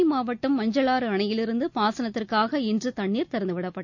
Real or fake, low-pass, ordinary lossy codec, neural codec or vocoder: real; 7.2 kHz; none; none